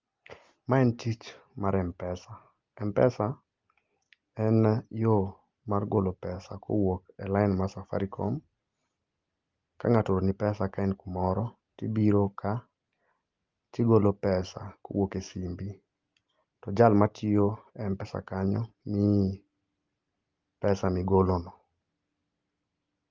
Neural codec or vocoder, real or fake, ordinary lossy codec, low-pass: none; real; Opus, 32 kbps; 7.2 kHz